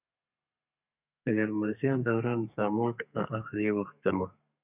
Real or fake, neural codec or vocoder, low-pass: fake; codec, 32 kHz, 1.9 kbps, SNAC; 3.6 kHz